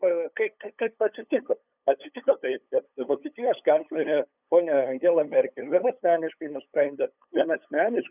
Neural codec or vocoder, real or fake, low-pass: codec, 16 kHz, 8 kbps, FunCodec, trained on LibriTTS, 25 frames a second; fake; 3.6 kHz